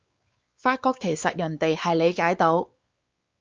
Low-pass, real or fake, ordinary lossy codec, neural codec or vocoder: 7.2 kHz; fake; Opus, 24 kbps; codec, 16 kHz, 4 kbps, X-Codec, WavLM features, trained on Multilingual LibriSpeech